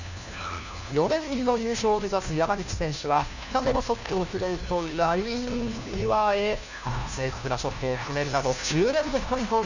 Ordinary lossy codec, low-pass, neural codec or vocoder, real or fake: none; 7.2 kHz; codec, 16 kHz, 1 kbps, FunCodec, trained on LibriTTS, 50 frames a second; fake